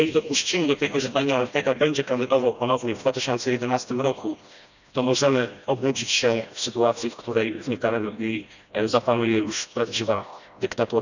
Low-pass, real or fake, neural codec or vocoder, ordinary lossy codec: 7.2 kHz; fake; codec, 16 kHz, 1 kbps, FreqCodec, smaller model; none